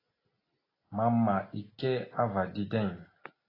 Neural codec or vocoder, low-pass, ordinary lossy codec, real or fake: none; 5.4 kHz; AAC, 24 kbps; real